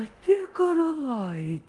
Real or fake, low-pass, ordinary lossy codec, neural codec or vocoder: fake; 10.8 kHz; Opus, 24 kbps; codec, 24 kHz, 0.9 kbps, DualCodec